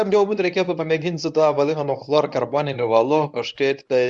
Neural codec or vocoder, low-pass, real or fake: codec, 24 kHz, 0.9 kbps, WavTokenizer, medium speech release version 1; 10.8 kHz; fake